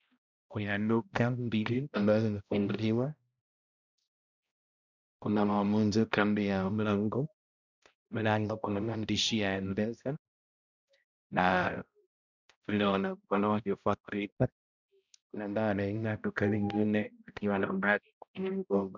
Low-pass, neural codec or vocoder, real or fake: 7.2 kHz; codec, 16 kHz, 0.5 kbps, X-Codec, HuBERT features, trained on balanced general audio; fake